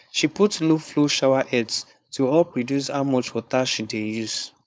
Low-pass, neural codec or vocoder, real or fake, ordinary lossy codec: none; codec, 16 kHz, 4.8 kbps, FACodec; fake; none